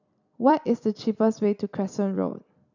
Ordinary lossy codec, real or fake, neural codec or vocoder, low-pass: none; fake; vocoder, 44.1 kHz, 128 mel bands every 256 samples, BigVGAN v2; 7.2 kHz